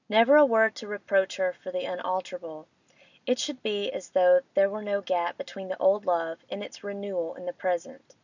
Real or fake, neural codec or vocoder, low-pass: real; none; 7.2 kHz